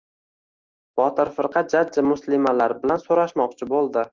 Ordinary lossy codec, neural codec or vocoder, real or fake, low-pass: Opus, 32 kbps; none; real; 7.2 kHz